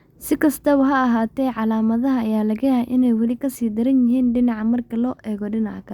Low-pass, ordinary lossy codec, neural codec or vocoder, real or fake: 19.8 kHz; Opus, 64 kbps; none; real